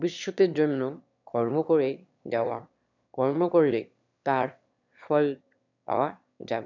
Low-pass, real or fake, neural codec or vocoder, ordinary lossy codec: 7.2 kHz; fake; autoencoder, 22.05 kHz, a latent of 192 numbers a frame, VITS, trained on one speaker; none